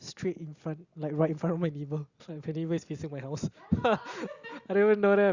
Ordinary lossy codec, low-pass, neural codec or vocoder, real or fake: Opus, 64 kbps; 7.2 kHz; none; real